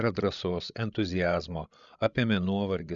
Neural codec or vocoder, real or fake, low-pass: codec, 16 kHz, 16 kbps, FreqCodec, larger model; fake; 7.2 kHz